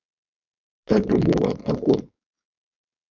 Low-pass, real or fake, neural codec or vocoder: 7.2 kHz; fake; codec, 16 kHz, 4.8 kbps, FACodec